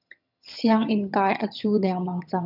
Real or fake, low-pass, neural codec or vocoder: fake; 5.4 kHz; vocoder, 22.05 kHz, 80 mel bands, HiFi-GAN